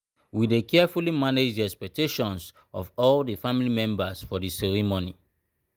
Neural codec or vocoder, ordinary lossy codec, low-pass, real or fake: none; Opus, 32 kbps; 19.8 kHz; real